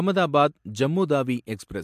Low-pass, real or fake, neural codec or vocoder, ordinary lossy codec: 14.4 kHz; real; none; MP3, 64 kbps